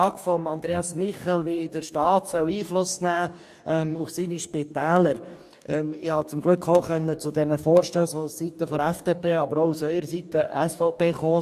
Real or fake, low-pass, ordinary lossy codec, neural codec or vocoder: fake; 14.4 kHz; AAC, 96 kbps; codec, 44.1 kHz, 2.6 kbps, DAC